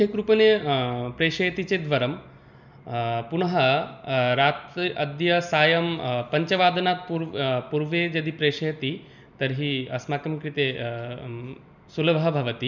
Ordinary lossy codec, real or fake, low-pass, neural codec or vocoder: none; real; 7.2 kHz; none